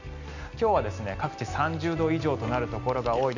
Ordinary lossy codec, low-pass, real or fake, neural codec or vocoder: none; 7.2 kHz; real; none